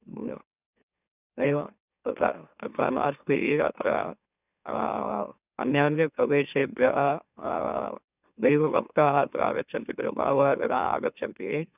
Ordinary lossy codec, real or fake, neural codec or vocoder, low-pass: none; fake; autoencoder, 44.1 kHz, a latent of 192 numbers a frame, MeloTTS; 3.6 kHz